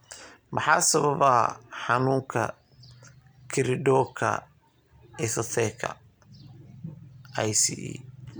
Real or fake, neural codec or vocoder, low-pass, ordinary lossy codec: real; none; none; none